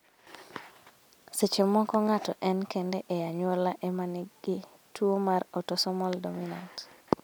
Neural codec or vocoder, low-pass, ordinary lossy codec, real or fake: none; none; none; real